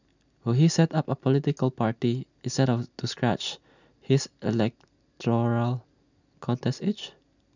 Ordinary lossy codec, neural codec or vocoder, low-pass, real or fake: none; none; 7.2 kHz; real